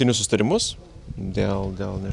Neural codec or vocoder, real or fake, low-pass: none; real; 10.8 kHz